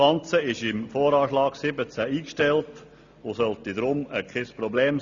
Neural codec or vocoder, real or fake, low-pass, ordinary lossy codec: none; real; 7.2 kHz; Opus, 64 kbps